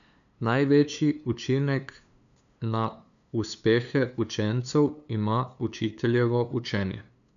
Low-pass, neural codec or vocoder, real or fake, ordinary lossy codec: 7.2 kHz; codec, 16 kHz, 2 kbps, FunCodec, trained on LibriTTS, 25 frames a second; fake; none